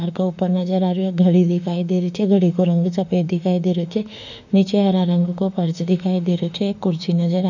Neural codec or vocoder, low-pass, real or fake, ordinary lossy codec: autoencoder, 48 kHz, 32 numbers a frame, DAC-VAE, trained on Japanese speech; 7.2 kHz; fake; none